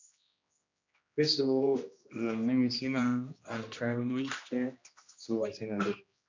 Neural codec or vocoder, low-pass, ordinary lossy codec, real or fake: codec, 16 kHz, 1 kbps, X-Codec, HuBERT features, trained on general audio; 7.2 kHz; MP3, 64 kbps; fake